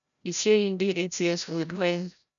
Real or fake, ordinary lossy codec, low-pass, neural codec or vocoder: fake; none; 7.2 kHz; codec, 16 kHz, 0.5 kbps, FreqCodec, larger model